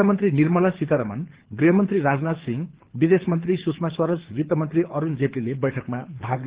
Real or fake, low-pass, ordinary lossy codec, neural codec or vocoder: fake; 3.6 kHz; Opus, 16 kbps; codec, 24 kHz, 6 kbps, HILCodec